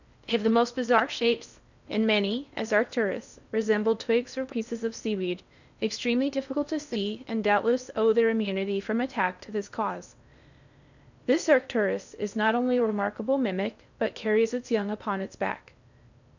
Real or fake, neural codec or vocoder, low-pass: fake; codec, 16 kHz in and 24 kHz out, 0.6 kbps, FocalCodec, streaming, 4096 codes; 7.2 kHz